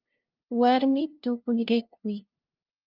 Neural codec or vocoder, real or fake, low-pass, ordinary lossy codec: codec, 16 kHz, 0.5 kbps, FunCodec, trained on LibriTTS, 25 frames a second; fake; 5.4 kHz; Opus, 32 kbps